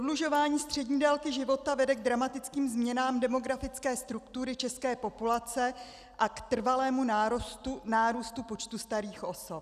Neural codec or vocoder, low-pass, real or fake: none; 14.4 kHz; real